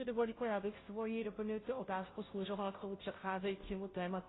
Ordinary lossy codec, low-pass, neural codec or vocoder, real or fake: AAC, 16 kbps; 7.2 kHz; codec, 16 kHz, 0.5 kbps, FunCodec, trained on Chinese and English, 25 frames a second; fake